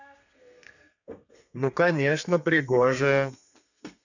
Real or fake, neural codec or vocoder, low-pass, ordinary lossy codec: fake; codec, 32 kHz, 1.9 kbps, SNAC; 7.2 kHz; none